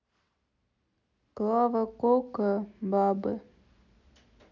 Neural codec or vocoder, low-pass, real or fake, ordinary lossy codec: none; 7.2 kHz; real; none